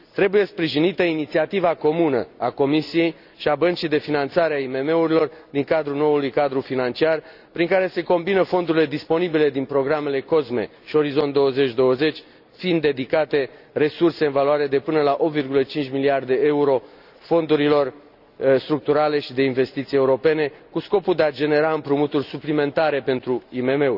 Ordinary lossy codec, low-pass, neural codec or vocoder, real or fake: none; 5.4 kHz; none; real